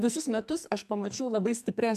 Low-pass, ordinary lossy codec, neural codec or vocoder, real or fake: 14.4 kHz; MP3, 96 kbps; codec, 44.1 kHz, 2.6 kbps, SNAC; fake